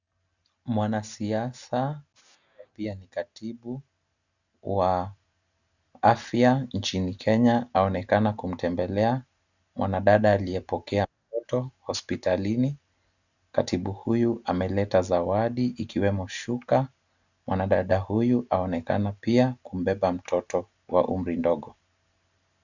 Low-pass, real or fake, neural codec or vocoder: 7.2 kHz; real; none